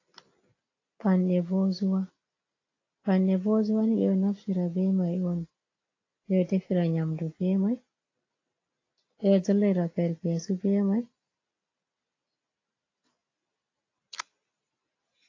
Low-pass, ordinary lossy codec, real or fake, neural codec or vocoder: 7.2 kHz; AAC, 32 kbps; real; none